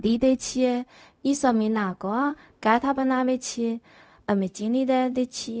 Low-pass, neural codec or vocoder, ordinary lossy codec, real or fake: none; codec, 16 kHz, 0.4 kbps, LongCat-Audio-Codec; none; fake